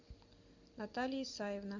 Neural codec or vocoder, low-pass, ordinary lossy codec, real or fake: vocoder, 44.1 kHz, 128 mel bands every 256 samples, BigVGAN v2; 7.2 kHz; MP3, 64 kbps; fake